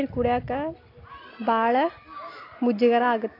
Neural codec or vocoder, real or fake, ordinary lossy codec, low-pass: none; real; AAC, 32 kbps; 5.4 kHz